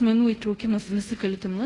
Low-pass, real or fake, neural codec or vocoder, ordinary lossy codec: 10.8 kHz; fake; codec, 24 kHz, 0.5 kbps, DualCodec; AAC, 32 kbps